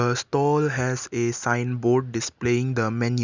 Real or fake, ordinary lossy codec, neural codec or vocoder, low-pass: real; Opus, 64 kbps; none; 7.2 kHz